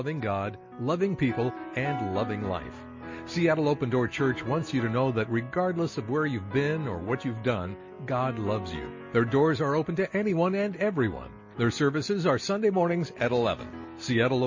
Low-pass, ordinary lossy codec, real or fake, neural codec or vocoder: 7.2 kHz; MP3, 32 kbps; real; none